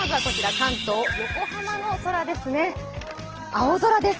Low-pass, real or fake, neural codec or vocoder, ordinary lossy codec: 7.2 kHz; real; none; Opus, 16 kbps